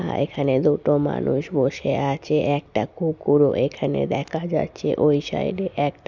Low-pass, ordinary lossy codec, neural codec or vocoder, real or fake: 7.2 kHz; none; none; real